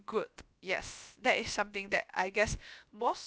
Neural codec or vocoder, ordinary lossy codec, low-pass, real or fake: codec, 16 kHz, about 1 kbps, DyCAST, with the encoder's durations; none; none; fake